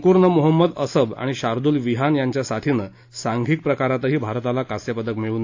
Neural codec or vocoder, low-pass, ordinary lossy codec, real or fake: none; 7.2 kHz; AAC, 48 kbps; real